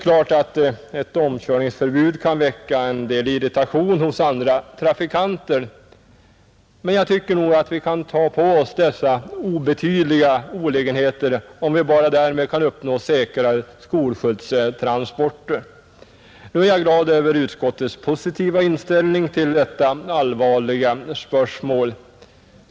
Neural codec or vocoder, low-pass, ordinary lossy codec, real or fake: none; none; none; real